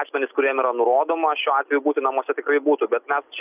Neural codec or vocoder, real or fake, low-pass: none; real; 3.6 kHz